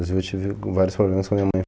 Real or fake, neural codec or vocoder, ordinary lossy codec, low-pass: real; none; none; none